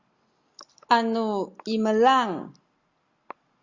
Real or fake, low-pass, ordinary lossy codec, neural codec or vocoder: real; 7.2 kHz; Opus, 64 kbps; none